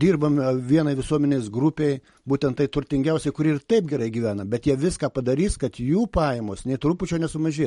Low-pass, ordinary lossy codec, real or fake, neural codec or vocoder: 19.8 kHz; MP3, 48 kbps; real; none